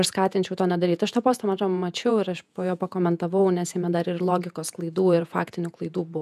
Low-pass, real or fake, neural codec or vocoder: 14.4 kHz; fake; vocoder, 48 kHz, 128 mel bands, Vocos